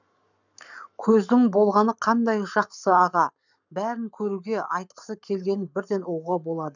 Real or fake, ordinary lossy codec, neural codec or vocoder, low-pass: fake; none; codec, 44.1 kHz, 7.8 kbps, Pupu-Codec; 7.2 kHz